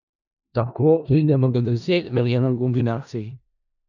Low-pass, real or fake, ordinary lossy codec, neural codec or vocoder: 7.2 kHz; fake; none; codec, 16 kHz in and 24 kHz out, 0.4 kbps, LongCat-Audio-Codec, four codebook decoder